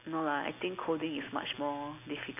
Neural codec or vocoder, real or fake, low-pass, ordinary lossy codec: none; real; 3.6 kHz; AAC, 32 kbps